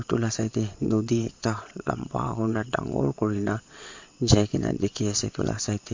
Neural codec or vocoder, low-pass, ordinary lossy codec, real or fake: vocoder, 22.05 kHz, 80 mel bands, WaveNeXt; 7.2 kHz; MP3, 48 kbps; fake